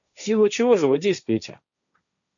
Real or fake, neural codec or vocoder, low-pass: fake; codec, 16 kHz, 1.1 kbps, Voila-Tokenizer; 7.2 kHz